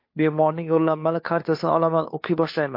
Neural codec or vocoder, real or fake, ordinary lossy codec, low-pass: codec, 16 kHz, 2 kbps, FunCodec, trained on Chinese and English, 25 frames a second; fake; MP3, 32 kbps; 5.4 kHz